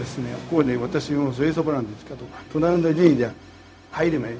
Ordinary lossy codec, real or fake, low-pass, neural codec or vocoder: none; fake; none; codec, 16 kHz, 0.4 kbps, LongCat-Audio-Codec